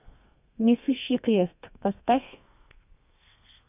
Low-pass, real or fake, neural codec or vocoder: 3.6 kHz; fake; codec, 32 kHz, 1.9 kbps, SNAC